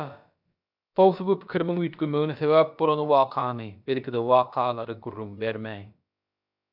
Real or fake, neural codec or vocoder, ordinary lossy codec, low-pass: fake; codec, 16 kHz, about 1 kbps, DyCAST, with the encoder's durations; AAC, 48 kbps; 5.4 kHz